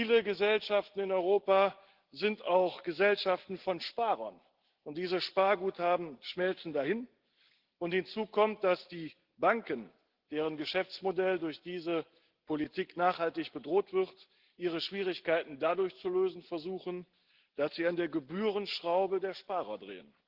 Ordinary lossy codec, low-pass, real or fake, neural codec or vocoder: Opus, 16 kbps; 5.4 kHz; real; none